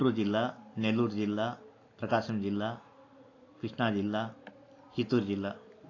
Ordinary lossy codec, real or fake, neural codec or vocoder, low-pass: AAC, 32 kbps; real; none; 7.2 kHz